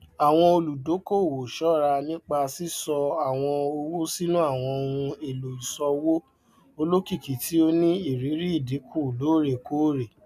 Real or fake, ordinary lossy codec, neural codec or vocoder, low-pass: real; none; none; 14.4 kHz